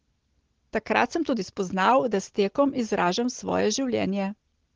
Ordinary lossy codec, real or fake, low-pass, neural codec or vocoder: Opus, 16 kbps; real; 7.2 kHz; none